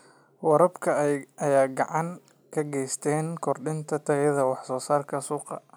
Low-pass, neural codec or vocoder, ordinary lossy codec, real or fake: none; none; none; real